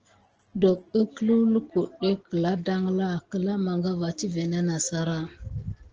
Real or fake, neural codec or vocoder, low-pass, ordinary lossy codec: real; none; 7.2 kHz; Opus, 16 kbps